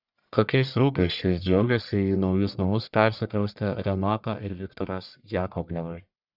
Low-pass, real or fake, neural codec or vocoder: 5.4 kHz; fake; codec, 44.1 kHz, 1.7 kbps, Pupu-Codec